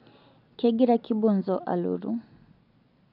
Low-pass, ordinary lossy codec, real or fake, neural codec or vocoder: 5.4 kHz; none; real; none